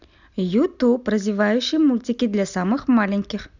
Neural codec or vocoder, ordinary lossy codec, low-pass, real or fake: none; none; 7.2 kHz; real